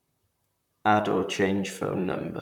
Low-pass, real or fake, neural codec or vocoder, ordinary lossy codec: 19.8 kHz; fake; vocoder, 44.1 kHz, 128 mel bands, Pupu-Vocoder; none